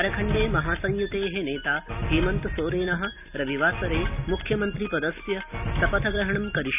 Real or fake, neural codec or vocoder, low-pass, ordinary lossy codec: real; none; 3.6 kHz; none